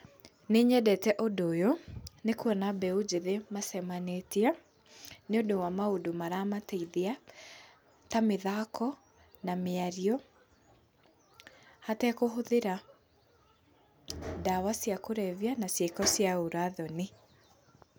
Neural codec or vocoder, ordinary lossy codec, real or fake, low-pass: none; none; real; none